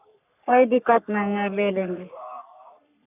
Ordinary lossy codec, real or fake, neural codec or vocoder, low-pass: none; fake; codec, 44.1 kHz, 3.4 kbps, Pupu-Codec; 3.6 kHz